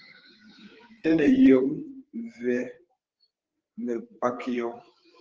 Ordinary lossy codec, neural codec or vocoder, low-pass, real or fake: Opus, 16 kbps; codec, 16 kHz, 4 kbps, FreqCodec, larger model; 7.2 kHz; fake